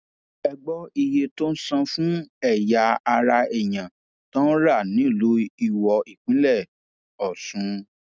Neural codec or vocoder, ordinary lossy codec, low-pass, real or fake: none; none; none; real